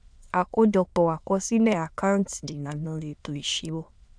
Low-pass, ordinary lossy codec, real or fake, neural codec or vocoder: 9.9 kHz; none; fake; autoencoder, 22.05 kHz, a latent of 192 numbers a frame, VITS, trained on many speakers